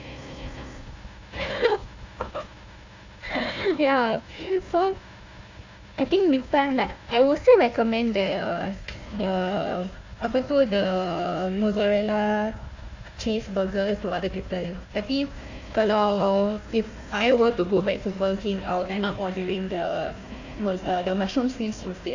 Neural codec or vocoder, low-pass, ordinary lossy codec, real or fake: codec, 16 kHz, 1 kbps, FunCodec, trained on Chinese and English, 50 frames a second; 7.2 kHz; AAC, 48 kbps; fake